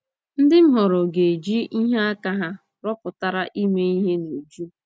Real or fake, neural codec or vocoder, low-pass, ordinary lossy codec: real; none; none; none